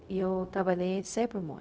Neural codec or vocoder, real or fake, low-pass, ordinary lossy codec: codec, 16 kHz, 0.4 kbps, LongCat-Audio-Codec; fake; none; none